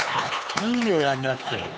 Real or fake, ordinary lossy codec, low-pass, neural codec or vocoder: fake; none; none; codec, 16 kHz, 4 kbps, X-Codec, HuBERT features, trained on LibriSpeech